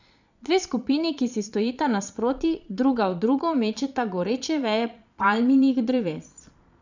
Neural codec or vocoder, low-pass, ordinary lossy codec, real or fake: vocoder, 22.05 kHz, 80 mel bands, Vocos; 7.2 kHz; none; fake